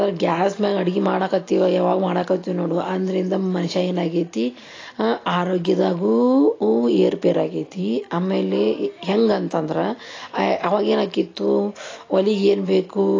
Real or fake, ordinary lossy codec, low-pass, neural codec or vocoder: real; AAC, 32 kbps; 7.2 kHz; none